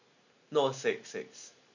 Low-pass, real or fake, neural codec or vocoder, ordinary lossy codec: 7.2 kHz; real; none; none